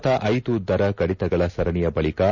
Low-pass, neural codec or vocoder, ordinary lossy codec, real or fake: 7.2 kHz; none; none; real